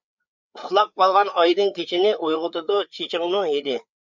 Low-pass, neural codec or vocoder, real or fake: 7.2 kHz; codec, 16 kHz, 4 kbps, FreqCodec, larger model; fake